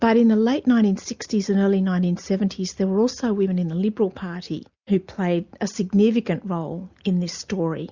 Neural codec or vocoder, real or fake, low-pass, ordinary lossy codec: none; real; 7.2 kHz; Opus, 64 kbps